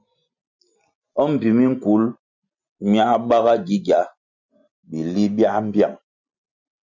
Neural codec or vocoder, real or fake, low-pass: none; real; 7.2 kHz